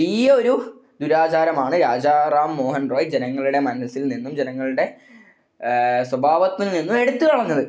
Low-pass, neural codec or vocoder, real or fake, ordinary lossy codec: none; none; real; none